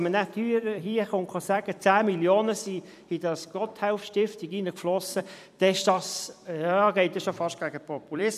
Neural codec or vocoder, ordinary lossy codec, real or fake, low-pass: vocoder, 44.1 kHz, 128 mel bands every 256 samples, BigVGAN v2; none; fake; 14.4 kHz